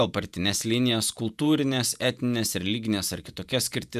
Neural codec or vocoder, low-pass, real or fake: vocoder, 48 kHz, 128 mel bands, Vocos; 14.4 kHz; fake